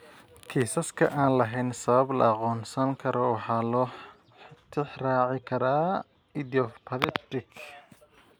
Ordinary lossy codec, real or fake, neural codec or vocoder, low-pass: none; real; none; none